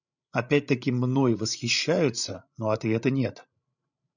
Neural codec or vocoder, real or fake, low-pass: codec, 16 kHz, 16 kbps, FreqCodec, larger model; fake; 7.2 kHz